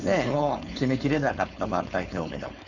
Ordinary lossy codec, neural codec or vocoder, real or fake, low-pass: none; codec, 16 kHz, 4.8 kbps, FACodec; fake; 7.2 kHz